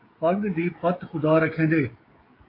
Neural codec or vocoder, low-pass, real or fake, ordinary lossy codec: codec, 16 kHz, 16 kbps, FreqCodec, smaller model; 5.4 kHz; fake; AAC, 24 kbps